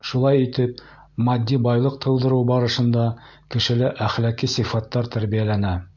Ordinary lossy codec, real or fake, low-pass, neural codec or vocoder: MP3, 64 kbps; real; 7.2 kHz; none